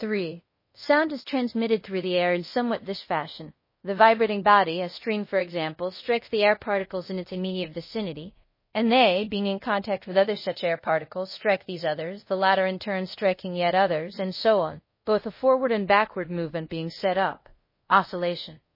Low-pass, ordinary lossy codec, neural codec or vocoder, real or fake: 5.4 kHz; MP3, 24 kbps; codec, 24 kHz, 0.5 kbps, DualCodec; fake